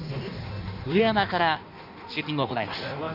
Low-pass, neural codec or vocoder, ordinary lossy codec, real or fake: 5.4 kHz; codec, 16 kHz in and 24 kHz out, 1.1 kbps, FireRedTTS-2 codec; none; fake